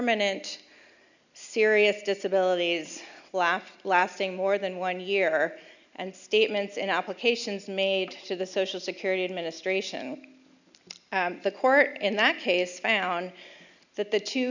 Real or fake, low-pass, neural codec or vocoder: real; 7.2 kHz; none